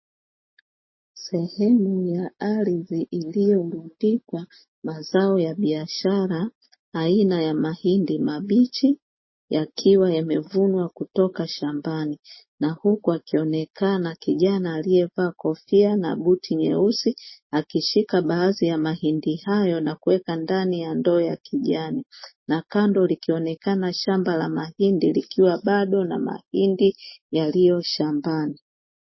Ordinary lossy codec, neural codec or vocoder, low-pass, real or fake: MP3, 24 kbps; none; 7.2 kHz; real